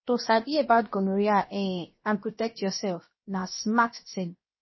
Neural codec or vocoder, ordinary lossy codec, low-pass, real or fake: codec, 16 kHz, 0.7 kbps, FocalCodec; MP3, 24 kbps; 7.2 kHz; fake